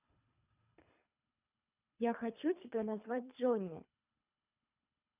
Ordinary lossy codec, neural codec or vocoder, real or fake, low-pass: none; codec, 24 kHz, 3 kbps, HILCodec; fake; 3.6 kHz